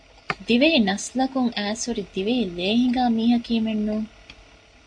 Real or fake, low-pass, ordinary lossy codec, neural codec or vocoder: real; 9.9 kHz; AAC, 64 kbps; none